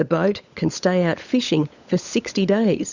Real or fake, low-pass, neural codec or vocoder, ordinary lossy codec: fake; 7.2 kHz; codec, 16 kHz, 16 kbps, FunCodec, trained on LibriTTS, 50 frames a second; Opus, 64 kbps